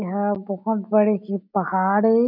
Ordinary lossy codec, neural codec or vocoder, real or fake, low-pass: none; none; real; 5.4 kHz